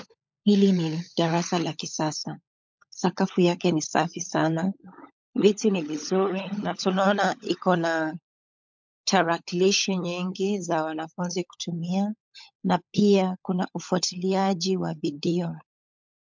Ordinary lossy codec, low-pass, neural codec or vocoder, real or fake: MP3, 64 kbps; 7.2 kHz; codec, 16 kHz, 16 kbps, FunCodec, trained on LibriTTS, 50 frames a second; fake